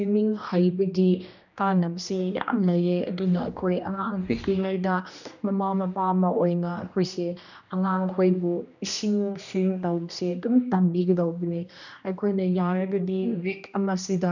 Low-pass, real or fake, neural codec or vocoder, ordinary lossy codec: 7.2 kHz; fake; codec, 16 kHz, 1 kbps, X-Codec, HuBERT features, trained on general audio; none